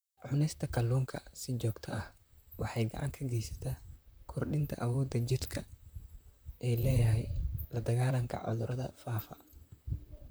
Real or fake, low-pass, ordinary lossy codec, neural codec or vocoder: fake; none; none; vocoder, 44.1 kHz, 128 mel bands, Pupu-Vocoder